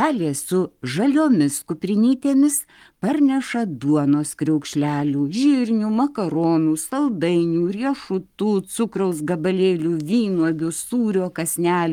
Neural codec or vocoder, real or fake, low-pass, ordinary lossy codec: codec, 44.1 kHz, 7.8 kbps, Pupu-Codec; fake; 19.8 kHz; Opus, 32 kbps